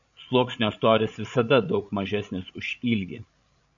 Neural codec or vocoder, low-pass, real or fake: codec, 16 kHz, 16 kbps, FreqCodec, larger model; 7.2 kHz; fake